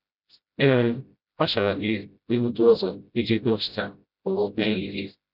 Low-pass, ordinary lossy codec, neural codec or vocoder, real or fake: 5.4 kHz; none; codec, 16 kHz, 0.5 kbps, FreqCodec, smaller model; fake